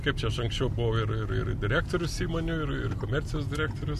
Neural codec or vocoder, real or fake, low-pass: none; real; 14.4 kHz